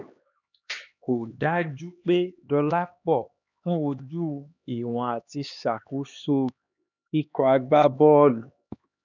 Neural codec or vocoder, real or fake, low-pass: codec, 16 kHz, 2 kbps, X-Codec, HuBERT features, trained on LibriSpeech; fake; 7.2 kHz